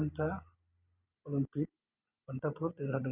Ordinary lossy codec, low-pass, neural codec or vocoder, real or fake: none; 3.6 kHz; none; real